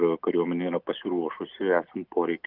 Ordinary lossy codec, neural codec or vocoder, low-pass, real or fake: Opus, 24 kbps; none; 5.4 kHz; real